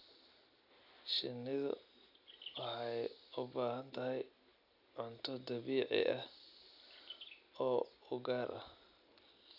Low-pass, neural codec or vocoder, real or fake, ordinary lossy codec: 5.4 kHz; none; real; none